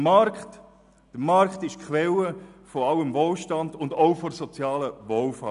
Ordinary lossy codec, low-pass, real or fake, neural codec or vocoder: none; 10.8 kHz; real; none